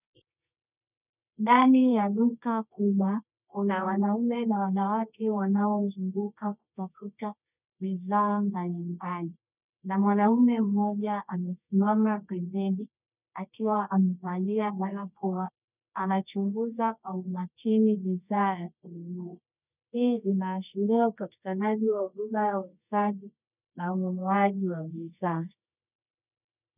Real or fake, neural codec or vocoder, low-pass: fake; codec, 24 kHz, 0.9 kbps, WavTokenizer, medium music audio release; 3.6 kHz